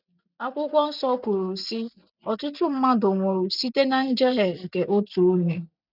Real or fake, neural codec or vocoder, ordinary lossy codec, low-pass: real; none; none; 5.4 kHz